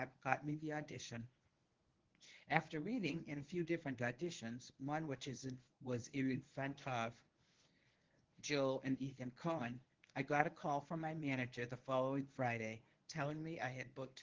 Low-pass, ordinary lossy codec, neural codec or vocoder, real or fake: 7.2 kHz; Opus, 16 kbps; codec, 24 kHz, 0.9 kbps, WavTokenizer, medium speech release version 1; fake